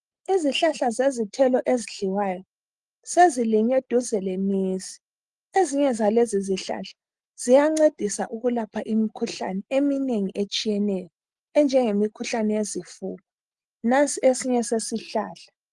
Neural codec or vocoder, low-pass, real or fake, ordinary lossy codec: none; 10.8 kHz; real; Opus, 24 kbps